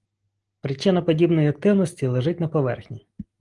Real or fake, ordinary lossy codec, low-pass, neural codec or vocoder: real; Opus, 24 kbps; 10.8 kHz; none